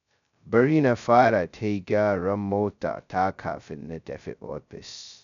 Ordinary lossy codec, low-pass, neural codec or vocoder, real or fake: none; 7.2 kHz; codec, 16 kHz, 0.2 kbps, FocalCodec; fake